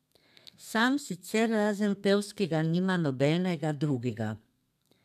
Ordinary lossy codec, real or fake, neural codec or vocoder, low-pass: none; fake; codec, 32 kHz, 1.9 kbps, SNAC; 14.4 kHz